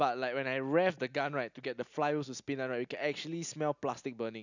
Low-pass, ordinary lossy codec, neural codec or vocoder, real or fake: 7.2 kHz; none; none; real